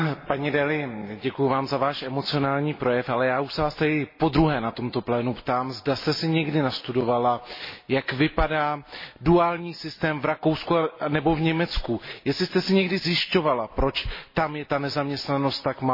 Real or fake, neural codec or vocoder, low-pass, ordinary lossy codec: real; none; 5.4 kHz; MP3, 24 kbps